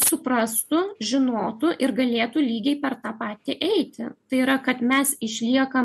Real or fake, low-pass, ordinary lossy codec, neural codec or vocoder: real; 14.4 kHz; MP3, 64 kbps; none